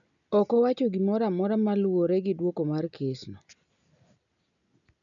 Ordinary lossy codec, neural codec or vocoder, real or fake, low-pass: none; none; real; 7.2 kHz